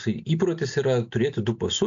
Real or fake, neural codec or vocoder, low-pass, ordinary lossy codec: real; none; 7.2 kHz; AAC, 64 kbps